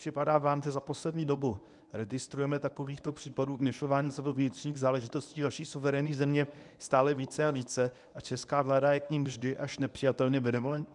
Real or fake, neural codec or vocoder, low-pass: fake; codec, 24 kHz, 0.9 kbps, WavTokenizer, medium speech release version 1; 10.8 kHz